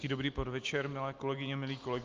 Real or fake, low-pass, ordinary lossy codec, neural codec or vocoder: real; 7.2 kHz; Opus, 24 kbps; none